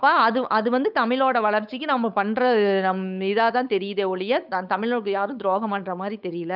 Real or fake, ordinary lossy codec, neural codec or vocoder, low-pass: fake; none; codec, 16 kHz, 8 kbps, FunCodec, trained on Chinese and English, 25 frames a second; 5.4 kHz